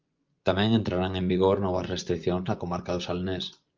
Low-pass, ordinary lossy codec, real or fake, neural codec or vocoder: 7.2 kHz; Opus, 24 kbps; fake; vocoder, 24 kHz, 100 mel bands, Vocos